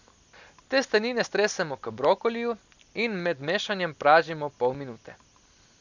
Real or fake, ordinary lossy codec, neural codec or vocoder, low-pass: real; none; none; 7.2 kHz